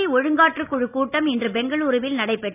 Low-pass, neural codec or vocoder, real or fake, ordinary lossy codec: 3.6 kHz; none; real; none